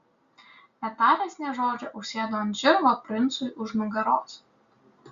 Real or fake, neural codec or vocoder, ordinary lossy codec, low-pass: real; none; Opus, 64 kbps; 7.2 kHz